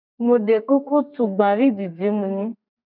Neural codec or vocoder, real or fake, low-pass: codec, 32 kHz, 1.9 kbps, SNAC; fake; 5.4 kHz